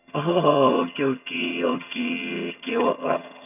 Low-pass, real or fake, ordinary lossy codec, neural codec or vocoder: 3.6 kHz; fake; none; vocoder, 22.05 kHz, 80 mel bands, HiFi-GAN